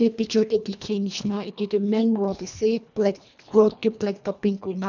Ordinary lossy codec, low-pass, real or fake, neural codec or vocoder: none; 7.2 kHz; fake; codec, 24 kHz, 1.5 kbps, HILCodec